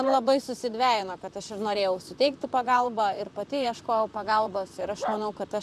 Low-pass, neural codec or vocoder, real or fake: 14.4 kHz; vocoder, 44.1 kHz, 128 mel bands, Pupu-Vocoder; fake